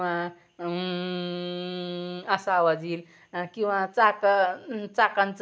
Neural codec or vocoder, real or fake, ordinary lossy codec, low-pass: none; real; none; none